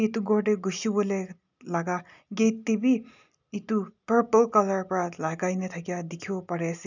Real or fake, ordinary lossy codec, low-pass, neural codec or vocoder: real; none; 7.2 kHz; none